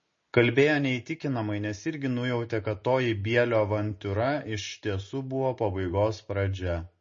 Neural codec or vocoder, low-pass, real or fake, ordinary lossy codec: none; 7.2 kHz; real; MP3, 32 kbps